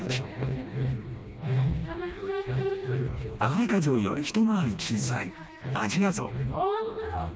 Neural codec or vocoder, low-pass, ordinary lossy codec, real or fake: codec, 16 kHz, 1 kbps, FreqCodec, smaller model; none; none; fake